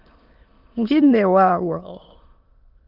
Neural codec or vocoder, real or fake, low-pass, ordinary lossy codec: autoencoder, 22.05 kHz, a latent of 192 numbers a frame, VITS, trained on many speakers; fake; 5.4 kHz; Opus, 24 kbps